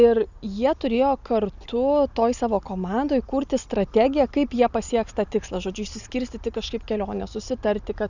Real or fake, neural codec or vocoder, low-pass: real; none; 7.2 kHz